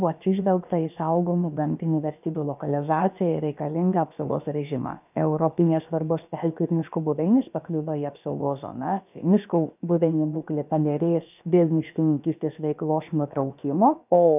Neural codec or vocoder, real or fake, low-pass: codec, 16 kHz, about 1 kbps, DyCAST, with the encoder's durations; fake; 3.6 kHz